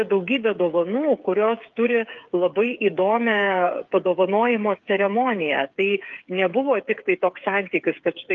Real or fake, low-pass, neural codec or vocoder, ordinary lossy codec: fake; 7.2 kHz; codec, 16 kHz, 8 kbps, FreqCodec, smaller model; Opus, 24 kbps